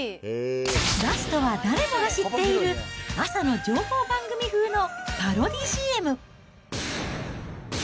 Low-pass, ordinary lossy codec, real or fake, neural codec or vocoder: none; none; real; none